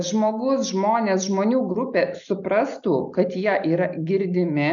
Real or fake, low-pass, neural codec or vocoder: real; 7.2 kHz; none